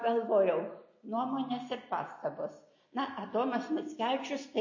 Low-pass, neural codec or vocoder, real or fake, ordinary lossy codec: 7.2 kHz; none; real; MP3, 32 kbps